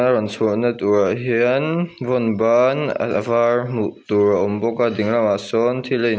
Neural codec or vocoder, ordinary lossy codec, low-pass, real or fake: none; none; none; real